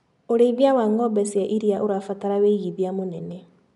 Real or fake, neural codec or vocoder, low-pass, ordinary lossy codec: real; none; 10.8 kHz; none